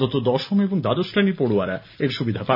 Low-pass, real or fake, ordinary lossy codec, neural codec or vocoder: 5.4 kHz; real; none; none